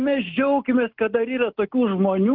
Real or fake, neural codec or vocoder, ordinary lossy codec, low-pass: real; none; Opus, 24 kbps; 5.4 kHz